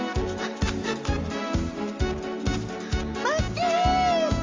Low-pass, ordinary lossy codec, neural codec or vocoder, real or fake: 7.2 kHz; Opus, 32 kbps; none; real